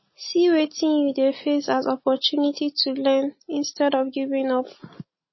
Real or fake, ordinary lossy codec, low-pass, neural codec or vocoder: real; MP3, 24 kbps; 7.2 kHz; none